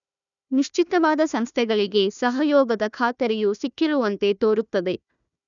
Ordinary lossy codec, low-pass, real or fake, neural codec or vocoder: none; 7.2 kHz; fake; codec, 16 kHz, 1 kbps, FunCodec, trained on Chinese and English, 50 frames a second